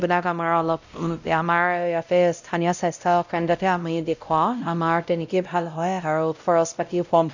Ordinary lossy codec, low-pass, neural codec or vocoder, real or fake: none; 7.2 kHz; codec, 16 kHz, 0.5 kbps, X-Codec, WavLM features, trained on Multilingual LibriSpeech; fake